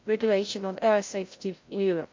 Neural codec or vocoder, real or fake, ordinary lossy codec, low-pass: codec, 16 kHz, 0.5 kbps, FreqCodec, larger model; fake; MP3, 48 kbps; 7.2 kHz